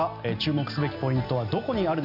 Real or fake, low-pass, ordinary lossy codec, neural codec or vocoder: real; 5.4 kHz; none; none